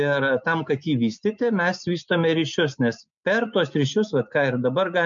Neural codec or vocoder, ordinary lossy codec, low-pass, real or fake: none; MP3, 64 kbps; 7.2 kHz; real